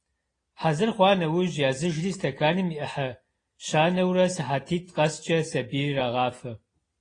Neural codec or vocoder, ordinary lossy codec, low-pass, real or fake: none; AAC, 32 kbps; 9.9 kHz; real